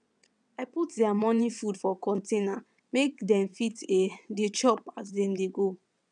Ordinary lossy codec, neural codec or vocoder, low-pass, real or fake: none; vocoder, 22.05 kHz, 80 mel bands, WaveNeXt; 9.9 kHz; fake